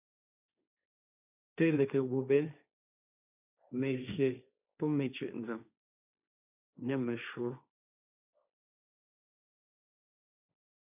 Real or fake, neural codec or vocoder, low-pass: fake; codec, 16 kHz, 1.1 kbps, Voila-Tokenizer; 3.6 kHz